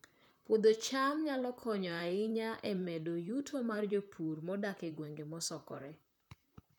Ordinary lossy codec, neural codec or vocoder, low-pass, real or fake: none; vocoder, 44.1 kHz, 128 mel bands, Pupu-Vocoder; 19.8 kHz; fake